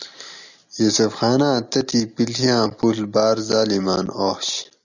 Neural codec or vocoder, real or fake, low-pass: none; real; 7.2 kHz